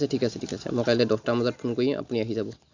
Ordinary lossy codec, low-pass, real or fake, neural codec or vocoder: Opus, 64 kbps; 7.2 kHz; real; none